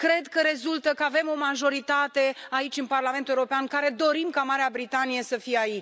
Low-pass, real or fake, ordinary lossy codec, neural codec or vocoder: none; real; none; none